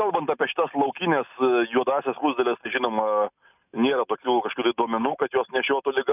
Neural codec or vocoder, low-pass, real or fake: none; 3.6 kHz; real